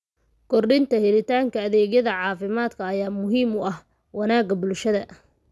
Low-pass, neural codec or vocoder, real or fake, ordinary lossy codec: none; none; real; none